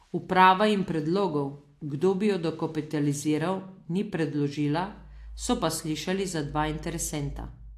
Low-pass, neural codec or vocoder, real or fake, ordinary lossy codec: 14.4 kHz; none; real; AAC, 64 kbps